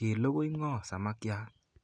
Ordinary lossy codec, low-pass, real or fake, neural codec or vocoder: none; 9.9 kHz; real; none